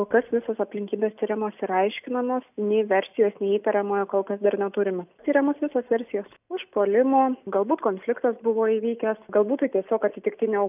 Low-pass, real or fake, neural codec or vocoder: 3.6 kHz; real; none